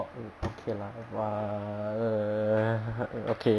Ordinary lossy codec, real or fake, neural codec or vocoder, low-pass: none; real; none; none